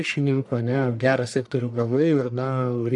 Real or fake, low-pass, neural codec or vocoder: fake; 10.8 kHz; codec, 44.1 kHz, 1.7 kbps, Pupu-Codec